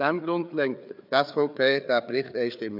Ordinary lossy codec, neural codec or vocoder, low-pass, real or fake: none; codec, 16 kHz, 4 kbps, FreqCodec, larger model; 5.4 kHz; fake